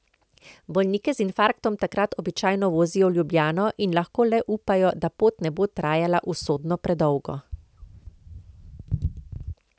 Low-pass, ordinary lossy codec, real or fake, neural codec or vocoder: none; none; real; none